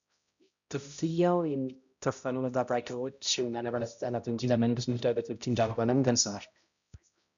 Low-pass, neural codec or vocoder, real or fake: 7.2 kHz; codec, 16 kHz, 0.5 kbps, X-Codec, HuBERT features, trained on balanced general audio; fake